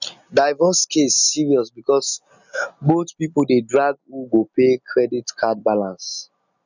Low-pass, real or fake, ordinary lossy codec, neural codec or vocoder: 7.2 kHz; real; none; none